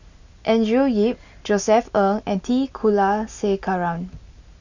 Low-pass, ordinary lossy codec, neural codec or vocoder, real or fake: 7.2 kHz; none; none; real